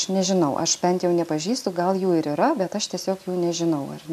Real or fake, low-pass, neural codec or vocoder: real; 14.4 kHz; none